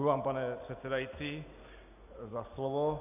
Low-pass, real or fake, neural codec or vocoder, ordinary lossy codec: 3.6 kHz; real; none; AAC, 32 kbps